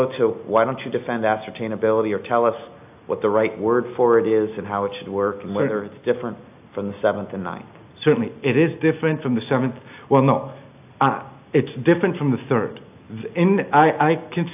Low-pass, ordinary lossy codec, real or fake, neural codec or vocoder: 3.6 kHz; AAC, 32 kbps; real; none